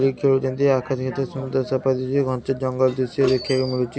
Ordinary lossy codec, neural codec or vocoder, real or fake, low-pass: none; none; real; none